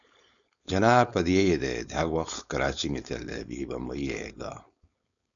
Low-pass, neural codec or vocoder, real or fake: 7.2 kHz; codec, 16 kHz, 4.8 kbps, FACodec; fake